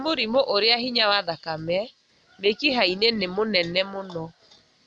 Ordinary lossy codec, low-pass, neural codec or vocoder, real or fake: none; none; none; real